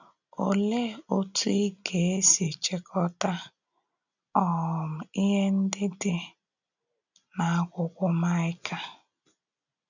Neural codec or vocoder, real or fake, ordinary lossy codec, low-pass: none; real; none; 7.2 kHz